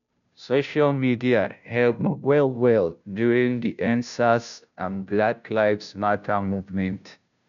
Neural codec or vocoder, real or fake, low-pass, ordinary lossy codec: codec, 16 kHz, 0.5 kbps, FunCodec, trained on Chinese and English, 25 frames a second; fake; 7.2 kHz; none